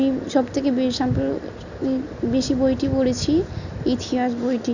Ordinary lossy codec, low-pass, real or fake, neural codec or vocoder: none; 7.2 kHz; real; none